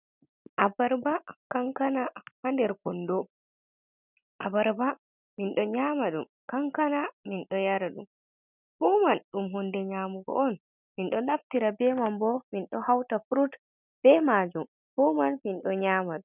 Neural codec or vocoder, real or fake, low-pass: none; real; 3.6 kHz